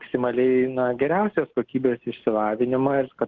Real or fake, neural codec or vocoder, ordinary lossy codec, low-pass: real; none; Opus, 16 kbps; 7.2 kHz